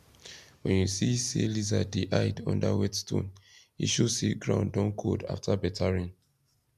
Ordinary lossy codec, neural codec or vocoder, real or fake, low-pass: none; none; real; 14.4 kHz